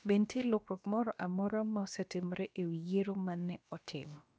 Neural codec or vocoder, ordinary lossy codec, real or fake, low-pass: codec, 16 kHz, about 1 kbps, DyCAST, with the encoder's durations; none; fake; none